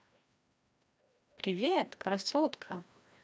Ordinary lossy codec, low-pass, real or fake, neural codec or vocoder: none; none; fake; codec, 16 kHz, 1 kbps, FreqCodec, larger model